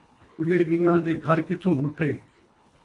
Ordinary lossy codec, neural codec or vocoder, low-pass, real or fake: AAC, 48 kbps; codec, 24 kHz, 1.5 kbps, HILCodec; 10.8 kHz; fake